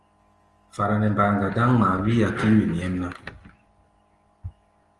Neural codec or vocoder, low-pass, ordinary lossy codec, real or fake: none; 10.8 kHz; Opus, 24 kbps; real